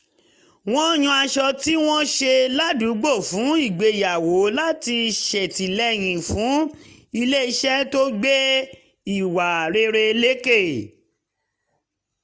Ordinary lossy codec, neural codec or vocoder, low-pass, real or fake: none; none; none; real